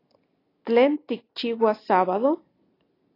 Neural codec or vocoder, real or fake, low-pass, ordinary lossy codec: none; real; 5.4 kHz; AAC, 24 kbps